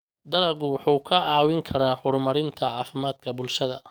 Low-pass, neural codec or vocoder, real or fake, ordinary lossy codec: none; codec, 44.1 kHz, 7.8 kbps, DAC; fake; none